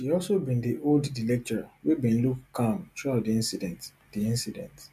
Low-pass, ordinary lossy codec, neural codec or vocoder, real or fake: 14.4 kHz; MP3, 96 kbps; none; real